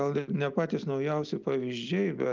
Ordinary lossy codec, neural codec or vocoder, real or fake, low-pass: Opus, 24 kbps; none; real; 7.2 kHz